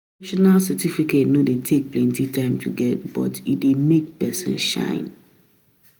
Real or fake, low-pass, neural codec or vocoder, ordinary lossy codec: real; none; none; none